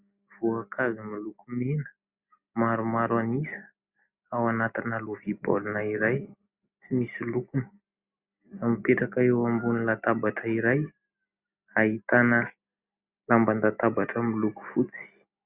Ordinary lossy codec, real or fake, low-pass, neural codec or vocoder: Opus, 64 kbps; real; 3.6 kHz; none